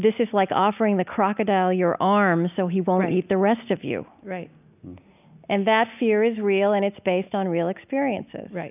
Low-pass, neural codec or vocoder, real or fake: 3.6 kHz; none; real